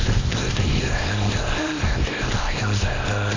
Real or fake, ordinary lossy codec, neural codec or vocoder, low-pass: fake; AAC, 32 kbps; codec, 24 kHz, 0.9 kbps, WavTokenizer, small release; 7.2 kHz